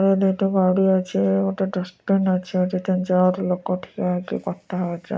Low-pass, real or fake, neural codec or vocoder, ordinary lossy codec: none; real; none; none